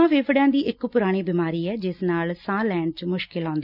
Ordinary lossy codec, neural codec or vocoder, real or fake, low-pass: none; none; real; 5.4 kHz